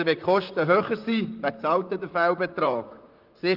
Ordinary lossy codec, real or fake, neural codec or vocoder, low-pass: Opus, 32 kbps; fake; vocoder, 44.1 kHz, 128 mel bands, Pupu-Vocoder; 5.4 kHz